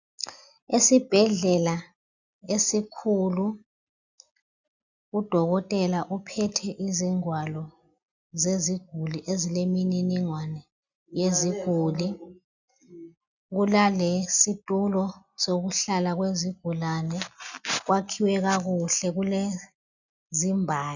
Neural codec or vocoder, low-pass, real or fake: none; 7.2 kHz; real